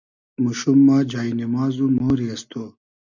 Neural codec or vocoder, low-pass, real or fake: none; 7.2 kHz; real